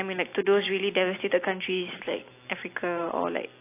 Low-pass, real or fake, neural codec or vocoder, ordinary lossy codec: 3.6 kHz; real; none; AAC, 24 kbps